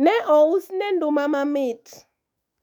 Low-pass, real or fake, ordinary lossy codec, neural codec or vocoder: 19.8 kHz; fake; none; autoencoder, 48 kHz, 128 numbers a frame, DAC-VAE, trained on Japanese speech